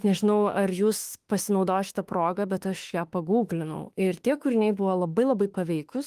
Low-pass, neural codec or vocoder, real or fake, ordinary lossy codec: 14.4 kHz; autoencoder, 48 kHz, 32 numbers a frame, DAC-VAE, trained on Japanese speech; fake; Opus, 24 kbps